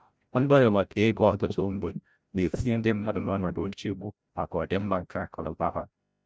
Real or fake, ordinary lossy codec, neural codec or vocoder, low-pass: fake; none; codec, 16 kHz, 0.5 kbps, FreqCodec, larger model; none